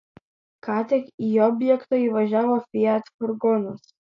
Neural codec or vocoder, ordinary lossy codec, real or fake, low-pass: none; AAC, 48 kbps; real; 7.2 kHz